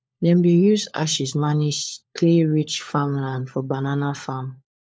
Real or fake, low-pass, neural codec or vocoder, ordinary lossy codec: fake; none; codec, 16 kHz, 4 kbps, FunCodec, trained on LibriTTS, 50 frames a second; none